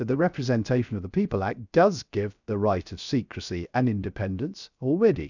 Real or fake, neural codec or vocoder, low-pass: fake; codec, 16 kHz, 0.3 kbps, FocalCodec; 7.2 kHz